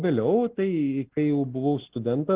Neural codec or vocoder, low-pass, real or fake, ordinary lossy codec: none; 3.6 kHz; real; Opus, 16 kbps